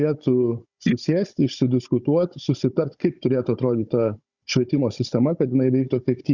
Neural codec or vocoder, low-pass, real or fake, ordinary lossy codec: codec, 16 kHz, 16 kbps, FunCodec, trained on Chinese and English, 50 frames a second; 7.2 kHz; fake; Opus, 64 kbps